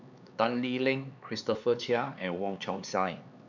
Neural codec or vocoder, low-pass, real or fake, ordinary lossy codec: codec, 16 kHz, 4 kbps, X-Codec, HuBERT features, trained on LibriSpeech; 7.2 kHz; fake; none